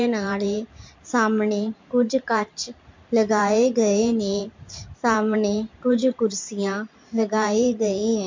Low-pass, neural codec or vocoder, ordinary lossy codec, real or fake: 7.2 kHz; vocoder, 44.1 kHz, 128 mel bands every 512 samples, BigVGAN v2; MP3, 48 kbps; fake